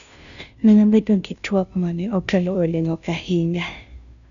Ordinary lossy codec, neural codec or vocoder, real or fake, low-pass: none; codec, 16 kHz, 0.5 kbps, FunCodec, trained on Chinese and English, 25 frames a second; fake; 7.2 kHz